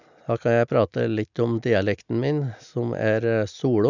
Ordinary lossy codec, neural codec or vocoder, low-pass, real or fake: none; none; 7.2 kHz; real